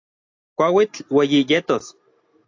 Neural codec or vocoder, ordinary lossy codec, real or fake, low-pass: none; AAC, 48 kbps; real; 7.2 kHz